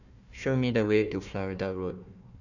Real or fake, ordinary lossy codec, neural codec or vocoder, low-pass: fake; none; codec, 16 kHz, 1 kbps, FunCodec, trained on Chinese and English, 50 frames a second; 7.2 kHz